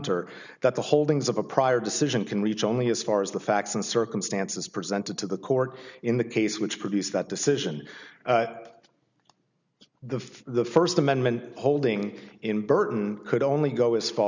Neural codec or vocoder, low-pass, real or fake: none; 7.2 kHz; real